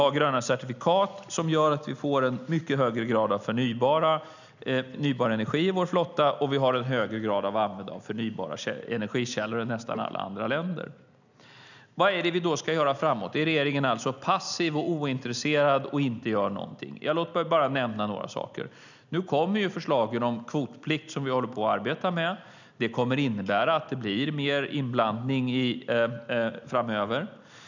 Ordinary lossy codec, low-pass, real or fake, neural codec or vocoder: none; 7.2 kHz; real; none